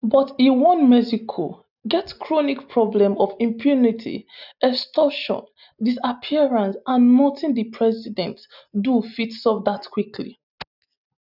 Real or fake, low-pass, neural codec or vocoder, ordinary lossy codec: real; 5.4 kHz; none; none